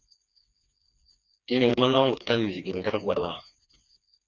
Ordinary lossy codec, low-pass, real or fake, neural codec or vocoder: Opus, 64 kbps; 7.2 kHz; fake; codec, 16 kHz, 2 kbps, FreqCodec, smaller model